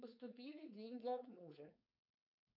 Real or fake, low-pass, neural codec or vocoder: fake; 5.4 kHz; codec, 16 kHz, 4.8 kbps, FACodec